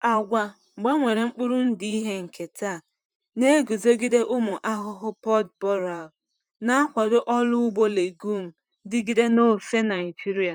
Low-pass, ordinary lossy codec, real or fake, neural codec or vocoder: 19.8 kHz; none; fake; vocoder, 44.1 kHz, 128 mel bands, Pupu-Vocoder